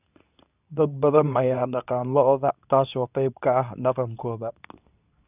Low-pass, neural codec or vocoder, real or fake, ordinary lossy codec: 3.6 kHz; codec, 24 kHz, 0.9 kbps, WavTokenizer, medium speech release version 2; fake; none